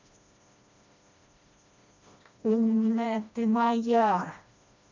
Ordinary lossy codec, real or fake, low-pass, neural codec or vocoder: MP3, 64 kbps; fake; 7.2 kHz; codec, 16 kHz, 1 kbps, FreqCodec, smaller model